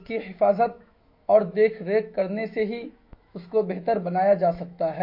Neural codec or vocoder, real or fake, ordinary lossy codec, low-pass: autoencoder, 48 kHz, 128 numbers a frame, DAC-VAE, trained on Japanese speech; fake; MP3, 32 kbps; 5.4 kHz